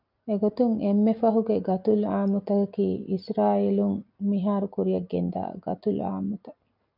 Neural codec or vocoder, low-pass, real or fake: none; 5.4 kHz; real